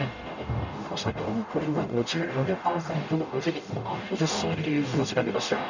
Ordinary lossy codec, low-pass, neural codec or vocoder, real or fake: none; 7.2 kHz; codec, 44.1 kHz, 0.9 kbps, DAC; fake